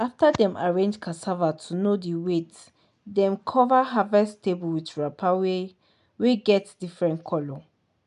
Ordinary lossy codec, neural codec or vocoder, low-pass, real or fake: none; none; 10.8 kHz; real